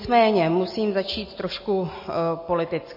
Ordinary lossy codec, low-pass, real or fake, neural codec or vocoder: MP3, 24 kbps; 5.4 kHz; real; none